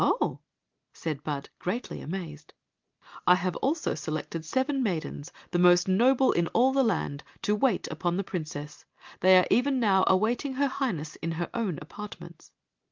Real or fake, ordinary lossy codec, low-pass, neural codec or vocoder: real; Opus, 32 kbps; 7.2 kHz; none